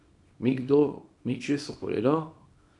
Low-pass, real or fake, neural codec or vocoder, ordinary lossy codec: 10.8 kHz; fake; codec, 24 kHz, 0.9 kbps, WavTokenizer, small release; none